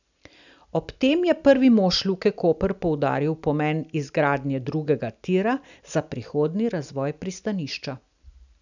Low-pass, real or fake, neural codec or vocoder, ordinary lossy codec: 7.2 kHz; real; none; none